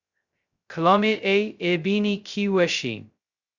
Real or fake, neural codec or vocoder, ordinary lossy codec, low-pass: fake; codec, 16 kHz, 0.2 kbps, FocalCodec; Opus, 64 kbps; 7.2 kHz